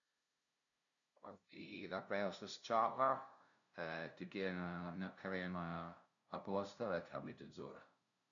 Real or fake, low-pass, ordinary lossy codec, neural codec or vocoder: fake; 7.2 kHz; none; codec, 16 kHz, 0.5 kbps, FunCodec, trained on LibriTTS, 25 frames a second